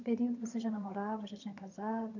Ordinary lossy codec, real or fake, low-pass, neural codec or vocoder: none; fake; 7.2 kHz; vocoder, 22.05 kHz, 80 mel bands, HiFi-GAN